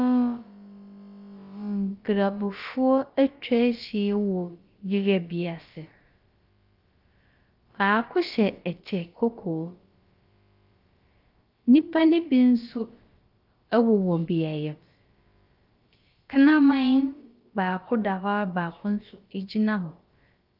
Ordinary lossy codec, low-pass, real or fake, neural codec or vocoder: Opus, 32 kbps; 5.4 kHz; fake; codec, 16 kHz, about 1 kbps, DyCAST, with the encoder's durations